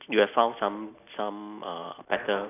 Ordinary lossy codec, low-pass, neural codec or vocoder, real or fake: none; 3.6 kHz; none; real